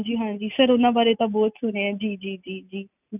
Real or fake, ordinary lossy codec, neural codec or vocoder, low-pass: real; none; none; 3.6 kHz